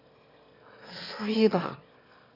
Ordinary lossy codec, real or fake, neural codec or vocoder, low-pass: none; fake; autoencoder, 22.05 kHz, a latent of 192 numbers a frame, VITS, trained on one speaker; 5.4 kHz